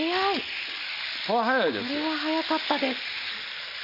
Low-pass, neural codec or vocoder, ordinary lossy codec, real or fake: 5.4 kHz; none; none; real